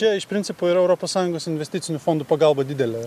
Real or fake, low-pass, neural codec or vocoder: real; 14.4 kHz; none